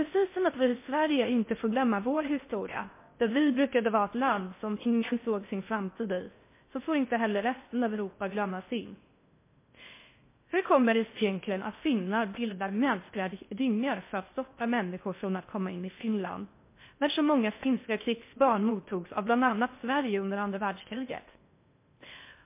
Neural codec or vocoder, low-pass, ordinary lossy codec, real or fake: codec, 16 kHz in and 24 kHz out, 0.6 kbps, FocalCodec, streaming, 4096 codes; 3.6 kHz; MP3, 24 kbps; fake